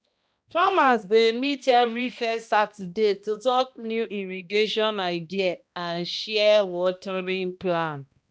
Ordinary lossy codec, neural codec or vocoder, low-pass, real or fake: none; codec, 16 kHz, 1 kbps, X-Codec, HuBERT features, trained on balanced general audio; none; fake